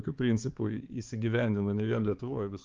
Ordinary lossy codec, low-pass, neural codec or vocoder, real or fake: Opus, 32 kbps; 7.2 kHz; codec, 16 kHz, 4 kbps, X-Codec, HuBERT features, trained on balanced general audio; fake